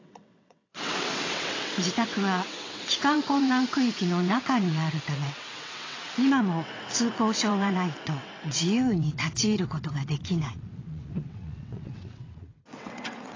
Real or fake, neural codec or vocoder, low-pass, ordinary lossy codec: fake; vocoder, 22.05 kHz, 80 mel bands, WaveNeXt; 7.2 kHz; AAC, 32 kbps